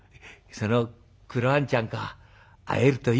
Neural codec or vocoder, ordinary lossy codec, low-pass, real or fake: none; none; none; real